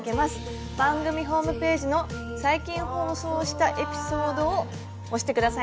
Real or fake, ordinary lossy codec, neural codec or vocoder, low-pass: real; none; none; none